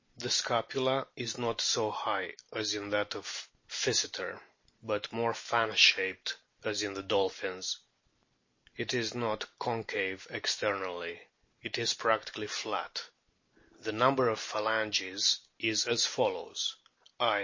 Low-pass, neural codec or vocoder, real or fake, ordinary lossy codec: 7.2 kHz; none; real; MP3, 32 kbps